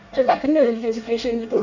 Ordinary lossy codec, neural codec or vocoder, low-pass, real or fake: none; codec, 24 kHz, 1 kbps, SNAC; 7.2 kHz; fake